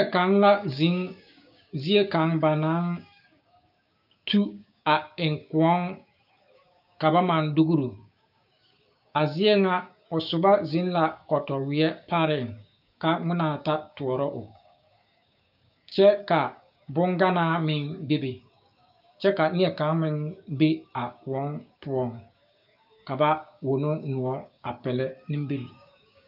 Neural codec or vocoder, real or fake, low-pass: codec, 16 kHz, 6 kbps, DAC; fake; 5.4 kHz